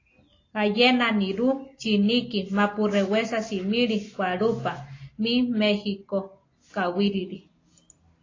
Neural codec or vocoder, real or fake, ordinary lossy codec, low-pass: none; real; AAC, 32 kbps; 7.2 kHz